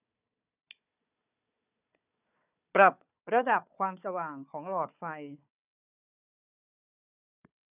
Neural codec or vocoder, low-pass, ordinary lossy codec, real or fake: codec, 16 kHz, 4 kbps, FunCodec, trained on Chinese and English, 50 frames a second; 3.6 kHz; none; fake